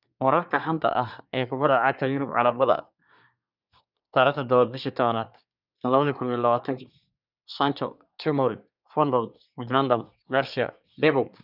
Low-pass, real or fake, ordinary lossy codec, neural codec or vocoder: 5.4 kHz; fake; none; codec, 24 kHz, 1 kbps, SNAC